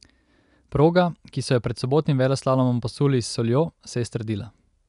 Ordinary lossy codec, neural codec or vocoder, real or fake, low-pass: none; none; real; 10.8 kHz